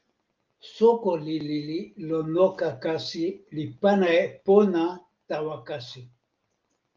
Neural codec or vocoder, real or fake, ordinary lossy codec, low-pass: none; real; Opus, 32 kbps; 7.2 kHz